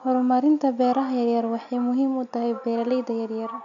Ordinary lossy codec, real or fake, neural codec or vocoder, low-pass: none; real; none; 7.2 kHz